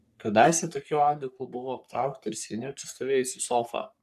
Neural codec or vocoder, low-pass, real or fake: codec, 44.1 kHz, 3.4 kbps, Pupu-Codec; 14.4 kHz; fake